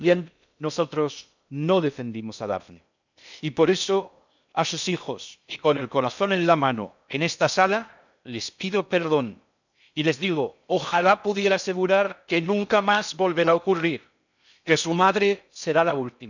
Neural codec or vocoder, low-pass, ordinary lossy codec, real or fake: codec, 16 kHz in and 24 kHz out, 0.6 kbps, FocalCodec, streaming, 2048 codes; 7.2 kHz; none; fake